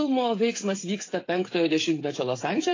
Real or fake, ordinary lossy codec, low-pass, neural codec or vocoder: fake; AAC, 32 kbps; 7.2 kHz; codec, 16 kHz, 8 kbps, FreqCodec, smaller model